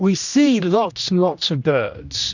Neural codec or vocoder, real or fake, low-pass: codec, 16 kHz, 1 kbps, X-Codec, HuBERT features, trained on general audio; fake; 7.2 kHz